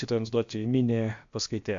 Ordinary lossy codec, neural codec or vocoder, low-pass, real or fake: AAC, 64 kbps; codec, 16 kHz, about 1 kbps, DyCAST, with the encoder's durations; 7.2 kHz; fake